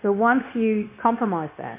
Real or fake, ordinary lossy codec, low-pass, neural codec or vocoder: fake; MP3, 24 kbps; 3.6 kHz; codec, 16 kHz, 0.9 kbps, LongCat-Audio-Codec